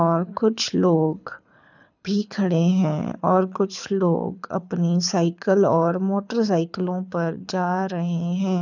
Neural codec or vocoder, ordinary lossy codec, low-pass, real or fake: codec, 24 kHz, 6 kbps, HILCodec; none; 7.2 kHz; fake